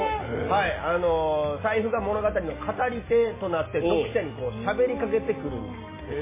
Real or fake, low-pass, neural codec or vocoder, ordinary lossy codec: real; 3.6 kHz; none; MP3, 16 kbps